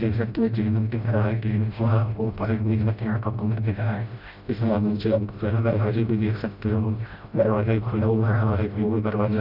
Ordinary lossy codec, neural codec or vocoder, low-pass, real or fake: none; codec, 16 kHz, 0.5 kbps, FreqCodec, smaller model; 5.4 kHz; fake